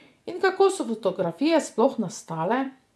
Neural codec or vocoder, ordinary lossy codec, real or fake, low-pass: vocoder, 24 kHz, 100 mel bands, Vocos; none; fake; none